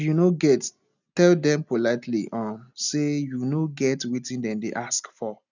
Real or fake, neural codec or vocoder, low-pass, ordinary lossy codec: real; none; 7.2 kHz; none